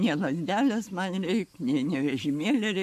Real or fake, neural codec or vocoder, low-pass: fake; codec, 44.1 kHz, 7.8 kbps, Pupu-Codec; 14.4 kHz